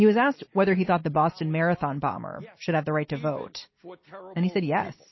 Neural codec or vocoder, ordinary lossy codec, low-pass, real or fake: none; MP3, 24 kbps; 7.2 kHz; real